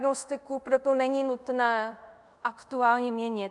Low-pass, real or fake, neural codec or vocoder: 10.8 kHz; fake; codec, 24 kHz, 0.5 kbps, DualCodec